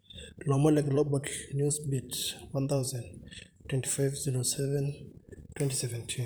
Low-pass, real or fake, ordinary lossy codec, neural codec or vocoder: none; fake; none; vocoder, 44.1 kHz, 128 mel bands, Pupu-Vocoder